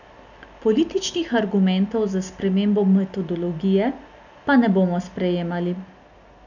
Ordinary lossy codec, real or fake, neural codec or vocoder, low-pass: none; real; none; 7.2 kHz